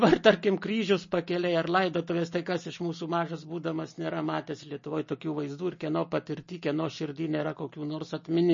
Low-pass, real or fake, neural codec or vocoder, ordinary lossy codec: 7.2 kHz; real; none; MP3, 32 kbps